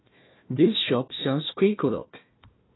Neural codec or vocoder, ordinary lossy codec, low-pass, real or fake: codec, 16 kHz, 1 kbps, FunCodec, trained on Chinese and English, 50 frames a second; AAC, 16 kbps; 7.2 kHz; fake